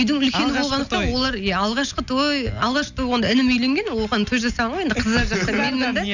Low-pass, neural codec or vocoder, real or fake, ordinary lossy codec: 7.2 kHz; none; real; none